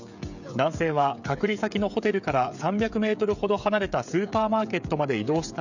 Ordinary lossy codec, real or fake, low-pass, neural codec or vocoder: none; fake; 7.2 kHz; codec, 16 kHz, 16 kbps, FreqCodec, smaller model